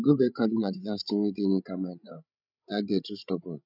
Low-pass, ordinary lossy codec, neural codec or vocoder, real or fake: 5.4 kHz; none; codec, 16 kHz, 16 kbps, FreqCodec, larger model; fake